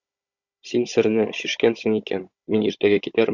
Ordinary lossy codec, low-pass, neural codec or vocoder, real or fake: Opus, 64 kbps; 7.2 kHz; codec, 16 kHz, 16 kbps, FunCodec, trained on Chinese and English, 50 frames a second; fake